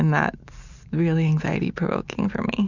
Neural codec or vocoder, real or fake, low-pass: none; real; 7.2 kHz